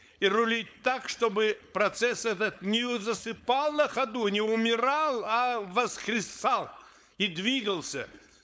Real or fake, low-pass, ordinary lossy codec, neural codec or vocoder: fake; none; none; codec, 16 kHz, 4.8 kbps, FACodec